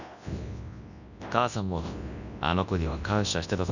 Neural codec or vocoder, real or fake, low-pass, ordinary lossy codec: codec, 24 kHz, 0.9 kbps, WavTokenizer, large speech release; fake; 7.2 kHz; none